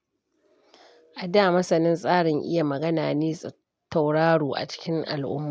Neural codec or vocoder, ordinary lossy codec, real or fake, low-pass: none; none; real; none